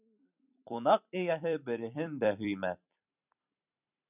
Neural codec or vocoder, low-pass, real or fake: vocoder, 44.1 kHz, 128 mel bands every 256 samples, BigVGAN v2; 3.6 kHz; fake